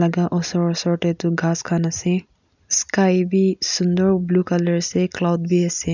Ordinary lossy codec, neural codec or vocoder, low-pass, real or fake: none; none; 7.2 kHz; real